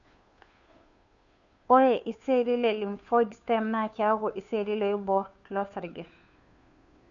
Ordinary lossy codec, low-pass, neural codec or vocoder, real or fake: none; 7.2 kHz; codec, 16 kHz, 2 kbps, FunCodec, trained on Chinese and English, 25 frames a second; fake